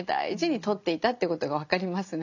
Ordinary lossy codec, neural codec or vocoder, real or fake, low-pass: none; none; real; 7.2 kHz